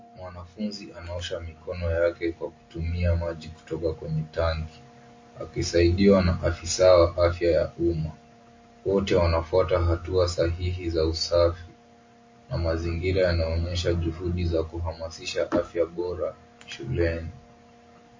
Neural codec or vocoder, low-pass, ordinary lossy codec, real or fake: none; 7.2 kHz; MP3, 32 kbps; real